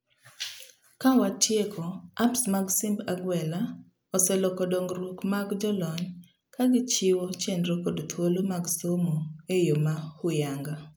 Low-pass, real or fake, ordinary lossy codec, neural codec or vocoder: none; real; none; none